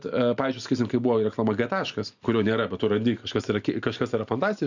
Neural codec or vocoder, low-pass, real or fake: none; 7.2 kHz; real